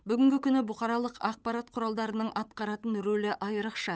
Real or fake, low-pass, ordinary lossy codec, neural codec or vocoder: fake; none; none; codec, 16 kHz, 8 kbps, FunCodec, trained on Chinese and English, 25 frames a second